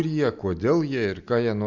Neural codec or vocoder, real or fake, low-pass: none; real; 7.2 kHz